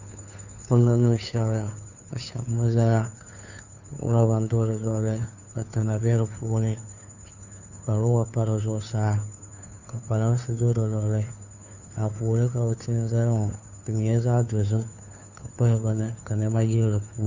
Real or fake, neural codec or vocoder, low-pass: fake; codec, 16 kHz, 2 kbps, FunCodec, trained on Chinese and English, 25 frames a second; 7.2 kHz